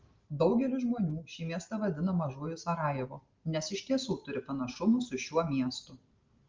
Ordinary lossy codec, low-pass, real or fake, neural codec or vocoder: Opus, 32 kbps; 7.2 kHz; real; none